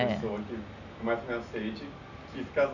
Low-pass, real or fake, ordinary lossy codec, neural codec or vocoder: 7.2 kHz; real; none; none